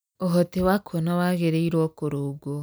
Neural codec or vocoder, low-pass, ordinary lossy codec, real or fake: none; none; none; real